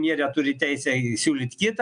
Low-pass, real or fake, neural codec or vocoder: 9.9 kHz; real; none